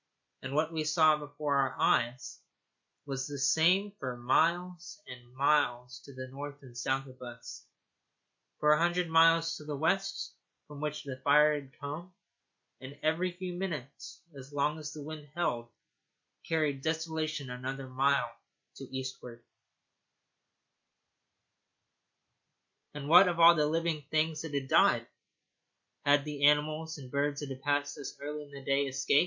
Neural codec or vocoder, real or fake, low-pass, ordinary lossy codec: none; real; 7.2 kHz; MP3, 48 kbps